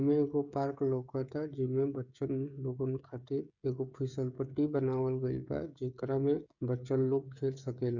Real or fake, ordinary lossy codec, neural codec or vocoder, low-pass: fake; none; codec, 16 kHz, 16 kbps, FreqCodec, smaller model; 7.2 kHz